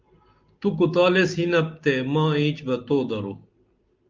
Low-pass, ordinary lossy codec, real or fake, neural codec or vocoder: 7.2 kHz; Opus, 24 kbps; real; none